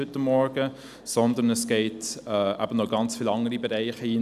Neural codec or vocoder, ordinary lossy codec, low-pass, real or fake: none; none; 14.4 kHz; real